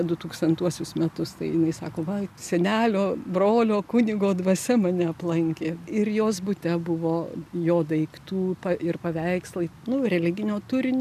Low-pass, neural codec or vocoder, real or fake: 14.4 kHz; none; real